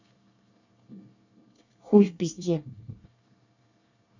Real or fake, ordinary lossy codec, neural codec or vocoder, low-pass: fake; none; codec, 24 kHz, 1 kbps, SNAC; 7.2 kHz